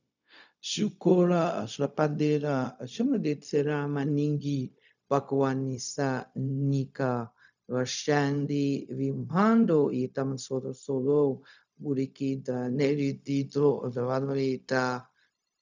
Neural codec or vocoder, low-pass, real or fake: codec, 16 kHz, 0.4 kbps, LongCat-Audio-Codec; 7.2 kHz; fake